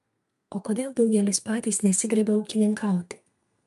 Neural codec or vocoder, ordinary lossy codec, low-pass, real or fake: codec, 32 kHz, 1.9 kbps, SNAC; MP3, 96 kbps; 14.4 kHz; fake